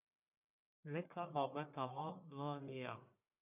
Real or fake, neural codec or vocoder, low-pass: fake; codec, 44.1 kHz, 1.7 kbps, Pupu-Codec; 3.6 kHz